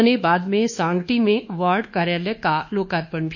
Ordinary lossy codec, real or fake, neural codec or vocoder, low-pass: none; fake; codec, 24 kHz, 1.2 kbps, DualCodec; 7.2 kHz